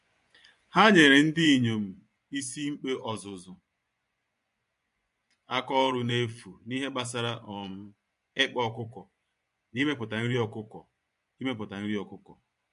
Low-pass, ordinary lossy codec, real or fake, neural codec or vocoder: 10.8 kHz; MP3, 64 kbps; fake; vocoder, 24 kHz, 100 mel bands, Vocos